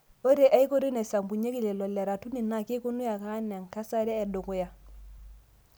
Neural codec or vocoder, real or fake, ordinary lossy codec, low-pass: none; real; none; none